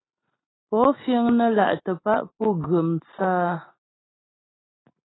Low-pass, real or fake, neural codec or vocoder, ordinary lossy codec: 7.2 kHz; real; none; AAC, 16 kbps